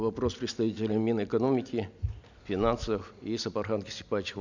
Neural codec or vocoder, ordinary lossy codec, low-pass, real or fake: none; none; 7.2 kHz; real